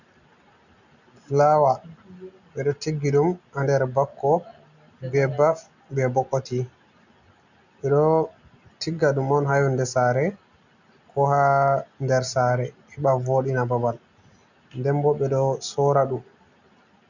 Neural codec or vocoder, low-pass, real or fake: none; 7.2 kHz; real